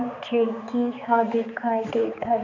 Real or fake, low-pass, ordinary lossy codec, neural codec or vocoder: fake; 7.2 kHz; none; codec, 16 kHz, 4 kbps, X-Codec, HuBERT features, trained on balanced general audio